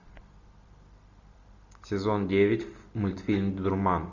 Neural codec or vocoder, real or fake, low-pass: none; real; 7.2 kHz